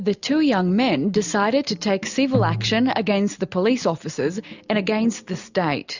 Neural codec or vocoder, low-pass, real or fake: none; 7.2 kHz; real